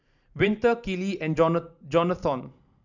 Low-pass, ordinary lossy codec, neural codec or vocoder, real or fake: 7.2 kHz; none; vocoder, 44.1 kHz, 128 mel bands every 512 samples, BigVGAN v2; fake